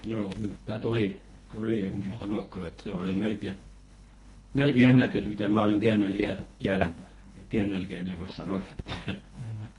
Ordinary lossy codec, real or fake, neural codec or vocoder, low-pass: AAC, 48 kbps; fake; codec, 24 kHz, 1.5 kbps, HILCodec; 10.8 kHz